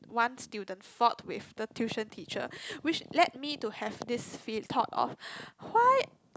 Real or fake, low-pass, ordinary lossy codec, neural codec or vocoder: real; none; none; none